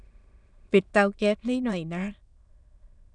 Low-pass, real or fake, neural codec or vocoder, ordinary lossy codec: 9.9 kHz; fake; autoencoder, 22.05 kHz, a latent of 192 numbers a frame, VITS, trained on many speakers; none